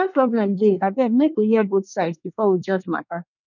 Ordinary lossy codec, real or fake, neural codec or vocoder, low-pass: none; fake; codec, 24 kHz, 1 kbps, SNAC; 7.2 kHz